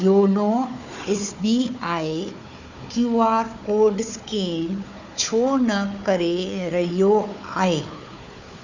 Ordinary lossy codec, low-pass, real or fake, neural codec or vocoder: none; 7.2 kHz; fake; codec, 16 kHz, 4 kbps, FunCodec, trained on Chinese and English, 50 frames a second